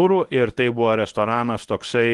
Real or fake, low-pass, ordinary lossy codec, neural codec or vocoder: fake; 10.8 kHz; Opus, 24 kbps; codec, 24 kHz, 0.9 kbps, WavTokenizer, medium speech release version 1